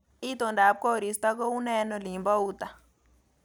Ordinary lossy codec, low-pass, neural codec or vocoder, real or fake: none; none; none; real